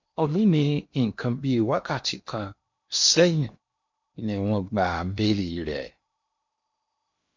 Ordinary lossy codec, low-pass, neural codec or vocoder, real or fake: MP3, 48 kbps; 7.2 kHz; codec, 16 kHz in and 24 kHz out, 0.8 kbps, FocalCodec, streaming, 65536 codes; fake